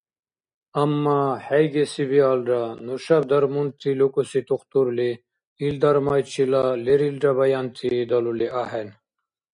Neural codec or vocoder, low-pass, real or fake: none; 9.9 kHz; real